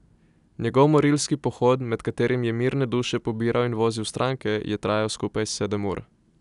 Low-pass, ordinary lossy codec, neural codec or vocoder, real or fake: 10.8 kHz; none; none; real